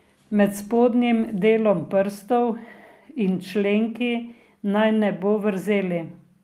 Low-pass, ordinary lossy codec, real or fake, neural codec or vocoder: 14.4 kHz; Opus, 32 kbps; real; none